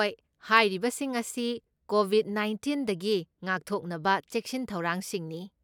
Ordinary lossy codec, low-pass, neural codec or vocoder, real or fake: none; 19.8 kHz; none; real